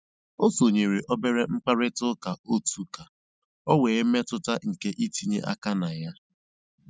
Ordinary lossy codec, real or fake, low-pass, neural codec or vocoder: none; real; none; none